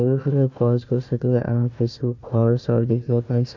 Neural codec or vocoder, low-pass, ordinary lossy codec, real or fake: codec, 16 kHz, 1 kbps, FunCodec, trained on Chinese and English, 50 frames a second; 7.2 kHz; none; fake